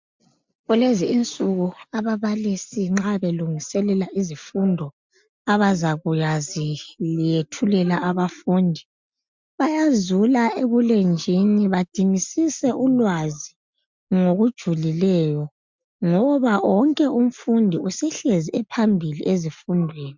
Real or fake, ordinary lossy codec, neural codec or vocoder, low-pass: real; MP3, 64 kbps; none; 7.2 kHz